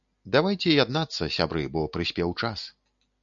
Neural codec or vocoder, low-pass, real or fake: none; 7.2 kHz; real